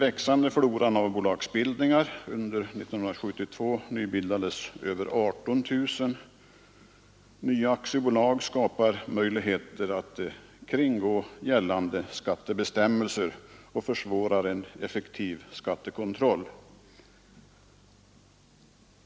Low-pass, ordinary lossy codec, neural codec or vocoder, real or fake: none; none; none; real